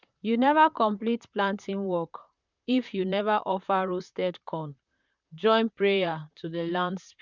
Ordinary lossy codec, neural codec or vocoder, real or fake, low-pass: none; vocoder, 44.1 kHz, 128 mel bands, Pupu-Vocoder; fake; 7.2 kHz